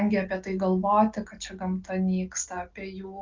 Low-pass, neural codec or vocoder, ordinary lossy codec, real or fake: 7.2 kHz; none; Opus, 24 kbps; real